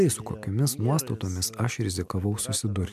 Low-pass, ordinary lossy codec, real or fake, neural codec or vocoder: 14.4 kHz; MP3, 96 kbps; fake; vocoder, 44.1 kHz, 128 mel bands every 256 samples, BigVGAN v2